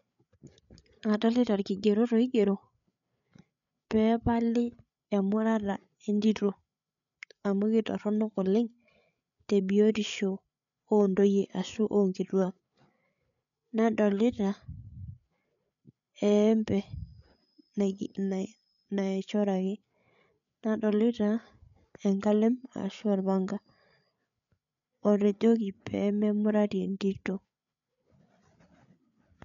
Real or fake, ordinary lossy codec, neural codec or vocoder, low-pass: fake; none; codec, 16 kHz, 8 kbps, FreqCodec, larger model; 7.2 kHz